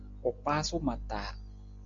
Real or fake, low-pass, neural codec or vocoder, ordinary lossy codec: real; 7.2 kHz; none; AAC, 48 kbps